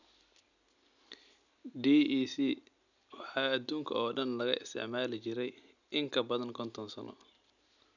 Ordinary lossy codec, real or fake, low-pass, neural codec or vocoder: none; real; 7.2 kHz; none